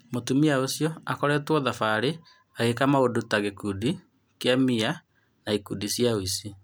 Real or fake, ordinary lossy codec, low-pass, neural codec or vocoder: real; none; none; none